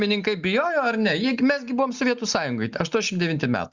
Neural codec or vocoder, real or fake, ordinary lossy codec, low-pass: none; real; Opus, 64 kbps; 7.2 kHz